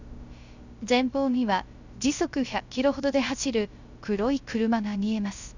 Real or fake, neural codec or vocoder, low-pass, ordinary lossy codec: fake; codec, 16 kHz, 0.3 kbps, FocalCodec; 7.2 kHz; Opus, 64 kbps